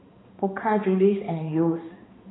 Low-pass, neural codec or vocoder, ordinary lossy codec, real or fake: 7.2 kHz; codec, 16 kHz, 2 kbps, X-Codec, HuBERT features, trained on balanced general audio; AAC, 16 kbps; fake